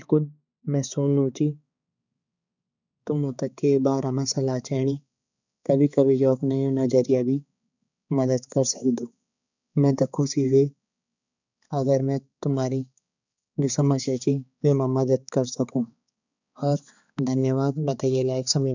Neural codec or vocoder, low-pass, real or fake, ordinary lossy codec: codec, 16 kHz, 4 kbps, X-Codec, HuBERT features, trained on balanced general audio; 7.2 kHz; fake; none